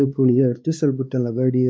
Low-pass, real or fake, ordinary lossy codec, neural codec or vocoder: none; fake; none; codec, 16 kHz, 2 kbps, X-Codec, WavLM features, trained on Multilingual LibriSpeech